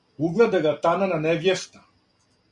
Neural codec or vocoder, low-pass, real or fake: none; 10.8 kHz; real